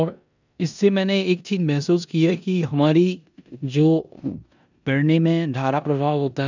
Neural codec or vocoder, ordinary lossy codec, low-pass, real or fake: codec, 16 kHz in and 24 kHz out, 0.9 kbps, LongCat-Audio-Codec, four codebook decoder; none; 7.2 kHz; fake